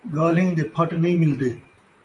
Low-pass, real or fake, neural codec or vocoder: 10.8 kHz; fake; vocoder, 44.1 kHz, 128 mel bands, Pupu-Vocoder